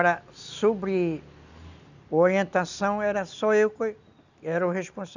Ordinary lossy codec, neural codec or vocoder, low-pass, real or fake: none; none; 7.2 kHz; real